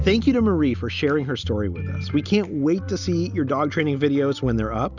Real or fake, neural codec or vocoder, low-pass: real; none; 7.2 kHz